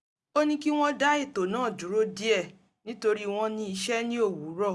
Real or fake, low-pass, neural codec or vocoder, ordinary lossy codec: real; none; none; none